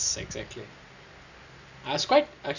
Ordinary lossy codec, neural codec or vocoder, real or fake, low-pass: none; none; real; 7.2 kHz